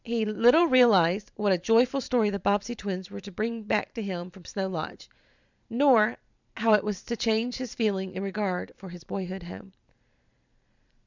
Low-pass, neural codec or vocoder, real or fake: 7.2 kHz; vocoder, 44.1 kHz, 128 mel bands every 512 samples, BigVGAN v2; fake